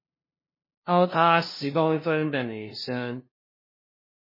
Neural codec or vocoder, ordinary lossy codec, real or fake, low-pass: codec, 16 kHz, 0.5 kbps, FunCodec, trained on LibriTTS, 25 frames a second; MP3, 24 kbps; fake; 5.4 kHz